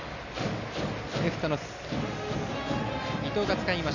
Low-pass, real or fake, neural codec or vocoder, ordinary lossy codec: 7.2 kHz; real; none; none